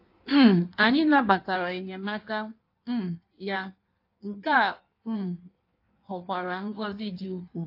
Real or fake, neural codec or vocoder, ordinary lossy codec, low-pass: fake; codec, 16 kHz in and 24 kHz out, 1.1 kbps, FireRedTTS-2 codec; AAC, 32 kbps; 5.4 kHz